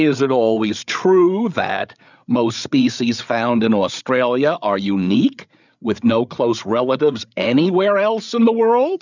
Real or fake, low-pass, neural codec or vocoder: fake; 7.2 kHz; codec, 16 kHz, 8 kbps, FreqCodec, larger model